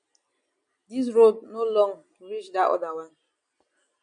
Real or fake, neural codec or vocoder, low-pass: real; none; 9.9 kHz